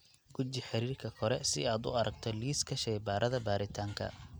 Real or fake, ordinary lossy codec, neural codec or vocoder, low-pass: real; none; none; none